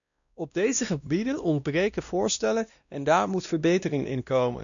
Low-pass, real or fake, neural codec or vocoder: 7.2 kHz; fake; codec, 16 kHz, 1 kbps, X-Codec, WavLM features, trained on Multilingual LibriSpeech